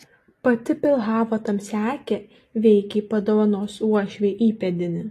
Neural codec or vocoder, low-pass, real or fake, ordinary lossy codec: none; 14.4 kHz; real; AAC, 48 kbps